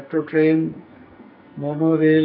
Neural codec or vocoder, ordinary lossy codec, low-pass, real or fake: codec, 44.1 kHz, 2.6 kbps, SNAC; none; 5.4 kHz; fake